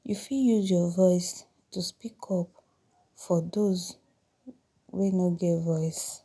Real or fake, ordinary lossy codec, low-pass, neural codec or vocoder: real; none; none; none